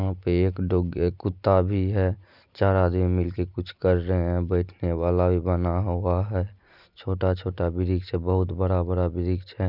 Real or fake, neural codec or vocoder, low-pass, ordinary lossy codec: real; none; 5.4 kHz; none